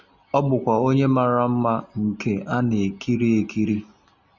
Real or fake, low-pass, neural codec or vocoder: real; 7.2 kHz; none